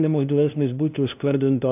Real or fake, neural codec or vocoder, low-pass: fake; codec, 16 kHz, 0.5 kbps, FunCodec, trained on LibriTTS, 25 frames a second; 3.6 kHz